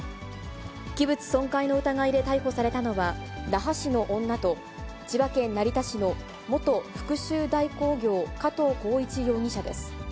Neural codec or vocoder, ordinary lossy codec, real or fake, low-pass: none; none; real; none